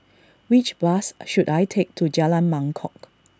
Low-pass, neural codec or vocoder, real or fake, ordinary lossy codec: none; none; real; none